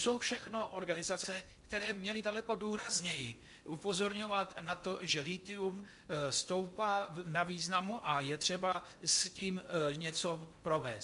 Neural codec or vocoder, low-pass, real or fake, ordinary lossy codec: codec, 16 kHz in and 24 kHz out, 0.8 kbps, FocalCodec, streaming, 65536 codes; 10.8 kHz; fake; MP3, 64 kbps